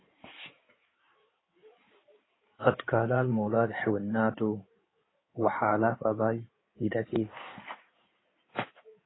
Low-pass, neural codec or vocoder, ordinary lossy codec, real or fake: 7.2 kHz; codec, 16 kHz in and 24 kHz out, 2.2 kbps, FireRedTTS-2 codec; AAC, 16 kbps; fake